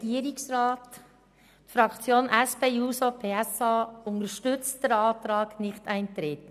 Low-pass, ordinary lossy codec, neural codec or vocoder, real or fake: 14.4 kHz; none; none; real